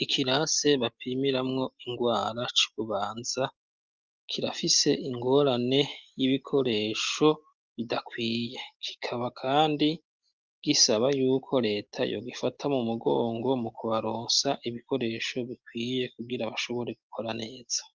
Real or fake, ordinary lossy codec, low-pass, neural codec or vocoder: real; Opus, 24 kbps; 7.2 kHz; none